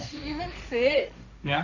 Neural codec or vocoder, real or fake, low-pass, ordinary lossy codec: codec, 16 kHz, 1.1 kbps, Voila-Tokenizer; fake; 7.2 kHz; none